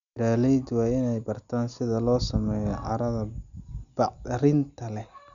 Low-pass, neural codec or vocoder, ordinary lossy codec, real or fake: 7.2 kHz; none; MP3, 96 kbps; real